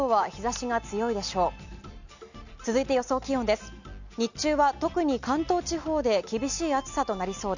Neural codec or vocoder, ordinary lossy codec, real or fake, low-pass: none; none; real; 7.2 kHz